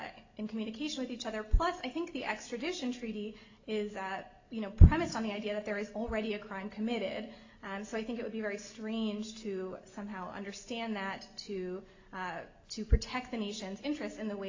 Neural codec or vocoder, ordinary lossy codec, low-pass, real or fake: none; AAC, 48 kbps; 7.2 kHz; real